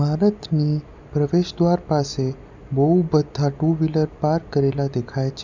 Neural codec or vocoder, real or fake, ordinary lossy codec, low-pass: none; real; none; 7.2 kHz